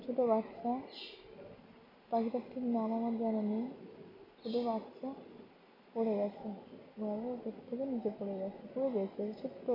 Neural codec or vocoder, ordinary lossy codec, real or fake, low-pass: none; MP3, 48 kbps; real; 5.4 kHz